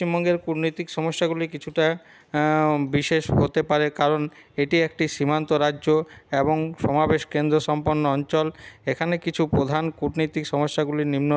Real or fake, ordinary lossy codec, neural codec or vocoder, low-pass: real; none; none; none